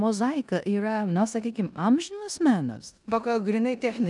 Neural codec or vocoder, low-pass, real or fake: codec, 16 kHz in and 24 kHz out, 0.9 kbps, LongCat-Audio-Codec, four codebook decoder; 10.8 kHz; fake